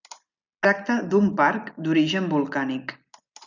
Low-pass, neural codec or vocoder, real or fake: 7.2 kHz; none; real